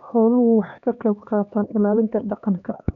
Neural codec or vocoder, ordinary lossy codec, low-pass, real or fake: codec, 16 kHz, 2 kbps, X-Codec, HuBERT features, trained on LibriSpeech; none; 7.2 kHz; fake